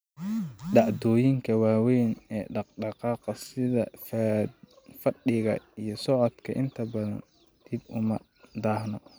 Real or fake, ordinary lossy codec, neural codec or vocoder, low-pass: real; none; none; none